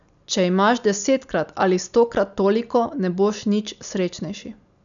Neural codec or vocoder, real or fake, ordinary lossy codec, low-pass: none; real; none; 7.2 kHz